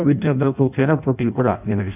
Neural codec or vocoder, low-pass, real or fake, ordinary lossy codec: codec, 16 kHz in and 24 kHz out, 0.6 kbps, FireRedTTS-2 codec; 3.6 kHz; fake; none